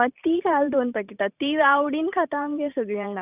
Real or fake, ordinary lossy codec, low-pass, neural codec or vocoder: fake; none; 3.6 kHz; vocoder, 44.1 kHz, 128 mel bands every 512 samples, BigVGAN v2